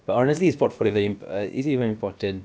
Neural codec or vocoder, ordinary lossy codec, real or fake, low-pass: codec, 16 kHz, about 1 kbps, DyCAST, with the encoder's durations; none; fake; none